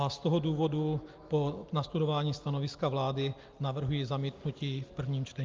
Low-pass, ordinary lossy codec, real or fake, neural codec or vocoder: 7.2 kHz; Opus, 24 kbps; real; none